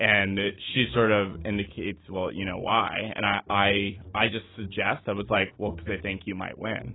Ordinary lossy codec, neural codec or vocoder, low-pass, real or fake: AAC, 16 kbps; autoencoder, 48 kHz, 128 numbers a frame, DAC-VAE, trained on Japanese speech; 7.2 kHz; fake